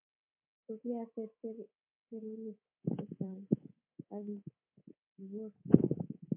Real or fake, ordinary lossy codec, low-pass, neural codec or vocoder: fake; MP3, 32 kbps; 3.6 kHz; codec, 24 kHz, 3.1 kbps, DualCodec